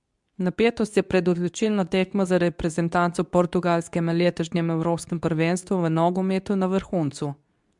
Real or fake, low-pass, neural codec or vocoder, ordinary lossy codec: fake; 10.8 kHz; codec, 24 kHz, 0.9 kbps, WavTokenizer, medium speech release version 2; MP3, 96 kbps